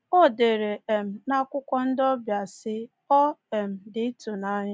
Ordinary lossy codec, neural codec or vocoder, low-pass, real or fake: none; none; none; real